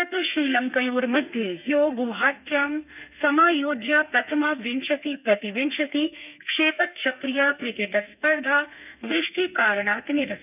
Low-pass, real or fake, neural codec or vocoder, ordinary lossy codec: 3.6 kHz; fake; codec, 32 kHz, 1.9 kbps, SNAC; none